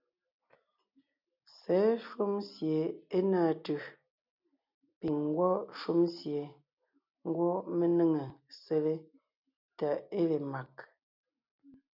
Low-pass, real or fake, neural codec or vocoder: 5.4 kHz; real; none